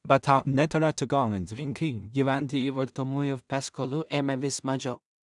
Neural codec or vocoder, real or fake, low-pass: codec, 16 kHz in and 24 kHz out, 0.4 kbps, LongCat-Audio-Codec, two codebook decoder; fake; 10.8 kHz